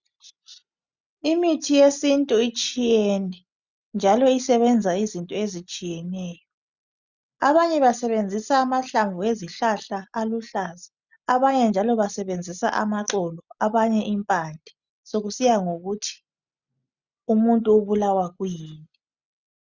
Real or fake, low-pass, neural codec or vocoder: real; 7.2 kHz; none